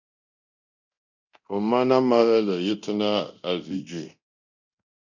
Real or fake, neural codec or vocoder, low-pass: fake; codec, 24 kHz, 0.9 kbps, DualCodec; 7.2 kHz